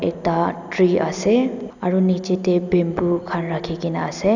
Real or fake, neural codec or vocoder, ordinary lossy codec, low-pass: real; none; none; 7.2 kHz